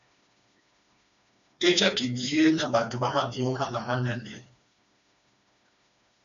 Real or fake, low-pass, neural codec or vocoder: fake; 7.2 kHz; codec, 16 kHz, 2 kbps, FreqCodec, smaller model